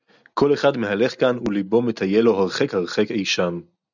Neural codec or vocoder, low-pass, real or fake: none; 7.2 kHz; real